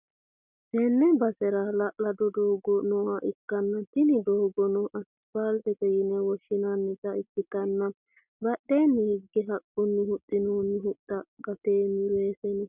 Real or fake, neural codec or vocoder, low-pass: real; none; 3.6 kHz